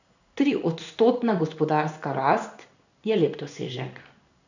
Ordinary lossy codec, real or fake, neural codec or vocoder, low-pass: none; fake; codec, 16 kHz in and 24 kHz out, 1 kbps, XY-Tokenizer; 7.2 kHz